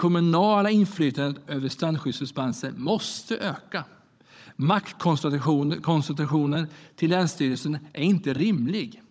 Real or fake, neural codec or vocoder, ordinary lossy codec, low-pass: fake; codec, 16 kHz, 16 kbps, FunCodec, trained on Chinese and English, 50 frames a second; none; none